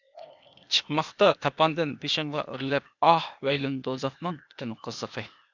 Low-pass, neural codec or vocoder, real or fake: 7.2 kHz; codec, 16 kHz, 0.8 kbps, ZipCodec; fake